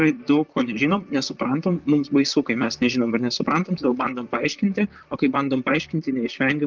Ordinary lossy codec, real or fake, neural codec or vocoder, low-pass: Opus, 24 kbps; fake; vocoder, 44.1 kHz, 128 mel bands, Pupu-Vocoder; 7.2 kHz